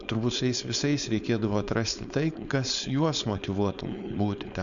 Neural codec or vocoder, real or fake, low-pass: codec, 16 kHz, 4.8 kbps, FACodec; fake; 7.2 kHz